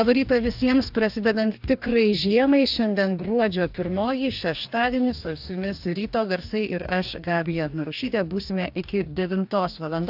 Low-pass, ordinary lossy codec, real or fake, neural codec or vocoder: 5.4 kHz; AAC, 48 kbps; fake; codec, 44.1 kHz, 2.6 kbps, DAC